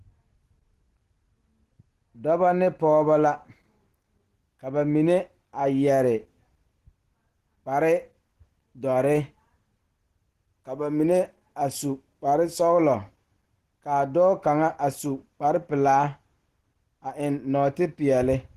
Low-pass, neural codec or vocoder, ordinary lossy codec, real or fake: 14.4 kHz; none; Opus, 16 kbps; real